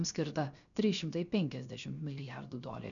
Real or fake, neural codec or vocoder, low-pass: fake; codec, 16 kHz, 0.7 kbps, FocalCodec; 7.2 kHz